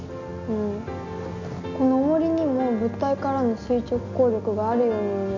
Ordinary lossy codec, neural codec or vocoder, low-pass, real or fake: none; none; 7.2 kHz; real